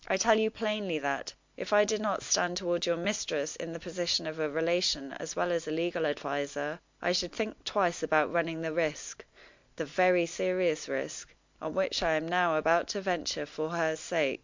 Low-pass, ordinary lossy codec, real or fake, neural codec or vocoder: 7.2 kHz; MP3, 64 kbps; real; none